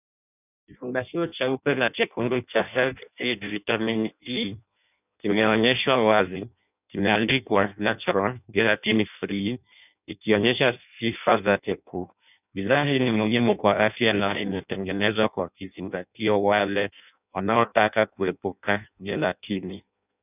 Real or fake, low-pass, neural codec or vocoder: fake; 3.6 kHz; codec, 16 kHz in and 24 kHz out, 0.6 kbps, FireRedTTS-2 codec